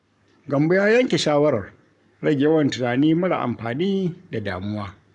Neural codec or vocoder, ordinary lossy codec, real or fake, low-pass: codec, 44.1 kHz, 7.8 kbps, Pupu-Codec; none; fake; 10.8 kHz